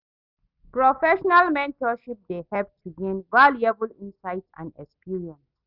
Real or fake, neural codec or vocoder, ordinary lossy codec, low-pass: real; none; none; 5.4 kHz